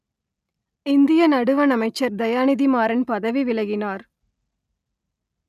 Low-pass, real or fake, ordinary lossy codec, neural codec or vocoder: 14.4 kHz; real; none; none